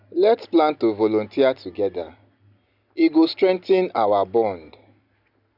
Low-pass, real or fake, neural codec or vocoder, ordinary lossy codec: 5.4 kHz; real; none; none